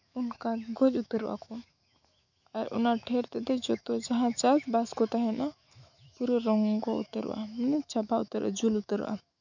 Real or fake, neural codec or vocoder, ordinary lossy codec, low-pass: fake; autoencoder, 48 kHz, 128 numbers a frame, DAC-VAE, trained on Japanese speech; none; 7.2 kHz